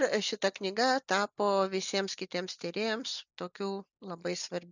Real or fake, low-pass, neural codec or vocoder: real; 7.2 kHz; none